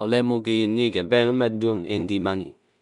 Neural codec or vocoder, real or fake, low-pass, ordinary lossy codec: codec, 16 kHz in and 24 kHz out, 0.4 kbps, LongCat-Audio-Codec, two codebook decoder; fake; 10.8 kHz; none